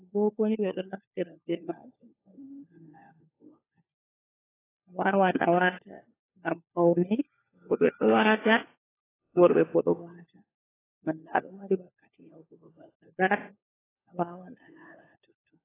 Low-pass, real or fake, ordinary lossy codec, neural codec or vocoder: 3.6 kHz; fake; AAC, 16 kbps; codec, 16 kHz, 4 kbps, FunCodec, trained on LibriTTS, 50 frames a second